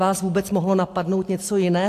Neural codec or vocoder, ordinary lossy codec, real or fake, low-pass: none; AAC, 64 kbps; real; 14.4 kHz